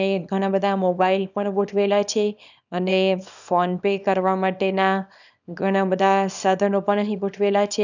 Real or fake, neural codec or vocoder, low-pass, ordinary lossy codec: fake; codec, 24 kHz, 0.9 kbps, WavTokenizer, small release; 7.2 kHz; none